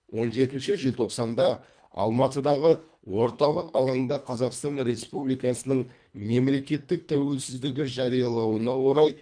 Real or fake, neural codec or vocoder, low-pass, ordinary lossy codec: fake; codec, 24 kHz, 1.5 kbps, HILCodec; 9.9 kHz; none